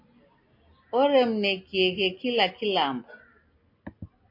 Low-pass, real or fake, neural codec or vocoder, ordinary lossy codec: 5.4 kHz; real; none; MP3, 32 kbps